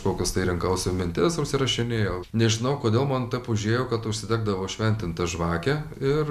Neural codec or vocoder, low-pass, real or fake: none; 14.4 kHz; real